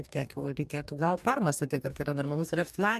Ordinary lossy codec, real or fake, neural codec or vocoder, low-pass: MP3, 96 kbps; fake; codec, 44.1 kHz, 2.6 kbps, DAC; 14.4 kHz